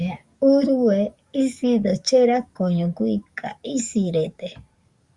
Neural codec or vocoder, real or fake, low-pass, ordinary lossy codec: codec, 44.1 kHz, 7.8 kbps, DAC; fake; 10.8 kHz; Opus, 64 kbps